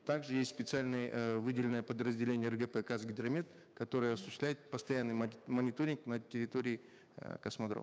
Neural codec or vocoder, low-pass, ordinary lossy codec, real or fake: codec, 16 kHz, 6 kbps, DAC; none; none; fake